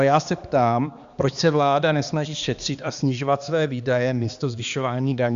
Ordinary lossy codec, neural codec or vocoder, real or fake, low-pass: Opus, 64 kbps; codec, 16 kHz, 2 kbps, X-Codec, HuBERT features, trained on balanced general audio; fake; 7.2 kHz